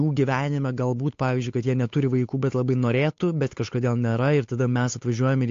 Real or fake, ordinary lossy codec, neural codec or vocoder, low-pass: fake; AAC, 48 kbps; codec, 16 kHz, 8 kbps, FunCodec, trained on Chinese and English, 25 frames a second; 7.2 kHz